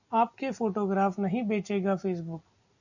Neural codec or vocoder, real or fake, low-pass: none; real; 7.2 kHz